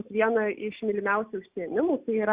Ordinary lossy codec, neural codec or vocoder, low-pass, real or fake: Opus, 64 kbps; none; 3.6 kHz; real